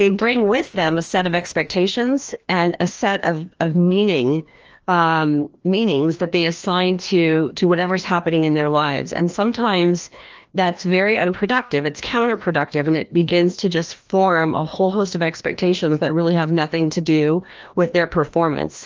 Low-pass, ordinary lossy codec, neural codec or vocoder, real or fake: 7.2 kHz; Opus, 24 kbps; codec, 16 kHz, 1 kbps, FreqCodec, larger model; fake